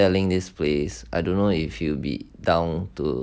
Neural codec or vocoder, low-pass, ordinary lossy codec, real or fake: none; none; none; real